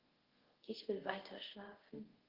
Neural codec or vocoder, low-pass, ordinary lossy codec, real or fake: codec, 24 kHz, 0.5 kbps, DualCodec; 5.4 kHz; Opus, 16 kbps; fake